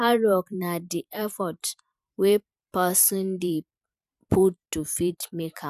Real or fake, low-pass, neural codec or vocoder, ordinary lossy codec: fake; 14.4 kHz; vocoder, 44.1 kHz, 128 mel bands every 512 samples, BigVGAN v2; none